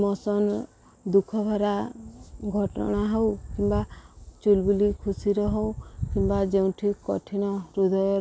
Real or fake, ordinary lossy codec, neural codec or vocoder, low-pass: real; none; none; none